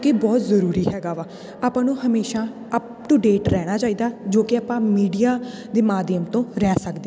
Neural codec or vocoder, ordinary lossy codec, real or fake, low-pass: none; none; real; none